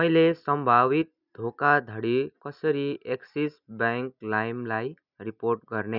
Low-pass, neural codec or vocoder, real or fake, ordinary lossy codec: 5.4 kHz; none; real; none